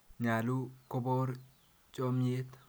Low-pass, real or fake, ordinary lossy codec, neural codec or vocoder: none; real; none; none